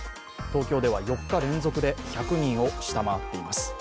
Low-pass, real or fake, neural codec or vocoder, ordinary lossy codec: none; real; none; none